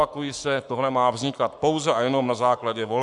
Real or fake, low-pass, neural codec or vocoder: fake; 10.8 kHz; codec, 44.1 kHz, 7.8 kbps, Pupu-Codec